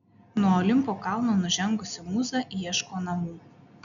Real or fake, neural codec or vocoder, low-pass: real; none; 7.2 kHz